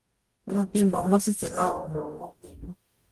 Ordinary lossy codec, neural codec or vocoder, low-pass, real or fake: Opus, 24 kbps; codec, 44.1 kHz, 0.9 kbps, DAC; 14.4 kHz; fake